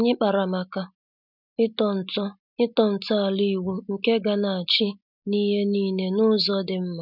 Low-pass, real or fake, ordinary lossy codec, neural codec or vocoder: 5.4 kHz; real; none; none